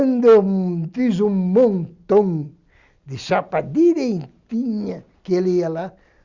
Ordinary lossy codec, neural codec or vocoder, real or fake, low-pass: Opus, 64 kbps; none; real; 7.2 kHz